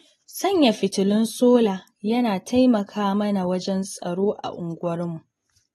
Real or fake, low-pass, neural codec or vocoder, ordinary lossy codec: real; 19.8 kHz; none; AAC, 32 kbps